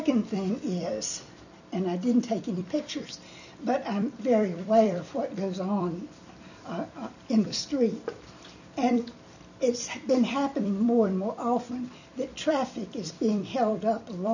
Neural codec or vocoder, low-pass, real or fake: vocoder, 44.1 kHz, 128 mel bands every 256 samples, BigVGAN v2; 7.2 kHz; fake